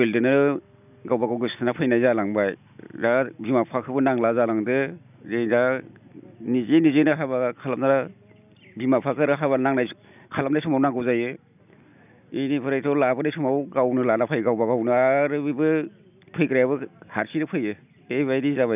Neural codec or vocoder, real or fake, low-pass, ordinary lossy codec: none; real; 3.6 kHz; none